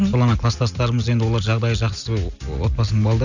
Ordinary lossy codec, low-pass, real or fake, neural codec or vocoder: none; 7.2 kHz; real; none